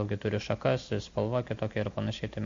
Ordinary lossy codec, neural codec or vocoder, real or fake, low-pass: MP3, 48 kbps; none; real; 7.2 kHz